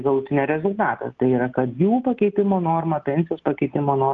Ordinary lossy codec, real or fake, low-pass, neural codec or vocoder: Opus, 32 kbps; real; 7.2 kHz; none